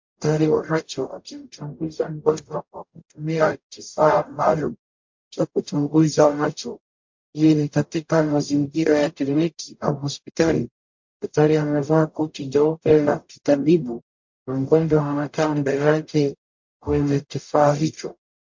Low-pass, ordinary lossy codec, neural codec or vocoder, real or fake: 7.2 kHz; MP3, 48 kbps; codec, 44.1 kHz, 0.9 kbps, DAC; fake